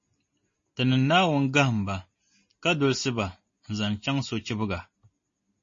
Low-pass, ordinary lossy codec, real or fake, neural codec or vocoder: 7.2 kHz; MP3, 32 kbps; real; none